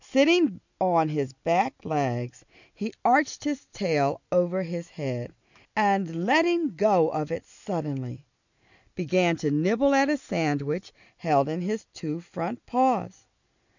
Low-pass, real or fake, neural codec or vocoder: 7.2 kHz; real; none